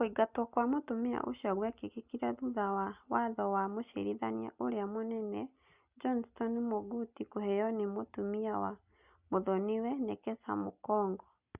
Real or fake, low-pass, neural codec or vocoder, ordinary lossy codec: real; 3.6 kHz; none; Opus, 64 kbps